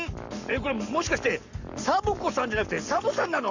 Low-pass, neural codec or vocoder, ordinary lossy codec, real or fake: 7.2 kHz; codec, 44.1 kHz, 7.8 kbps, Pupu-Codec; none; fake